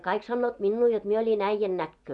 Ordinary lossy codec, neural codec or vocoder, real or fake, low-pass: none; vocoder, 24 kHz, 100 mel bands, Vocos; fake; 10.8 kHz